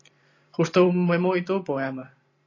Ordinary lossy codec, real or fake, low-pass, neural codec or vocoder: AAC, 32 kbps; fake; 7.2 kHz; vocoder, 44.1 kHz, 128 mel bands every 512 samples, BigVGAN v2